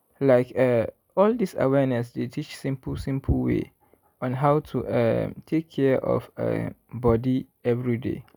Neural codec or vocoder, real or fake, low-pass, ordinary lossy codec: none; real; 19.8 kHz; none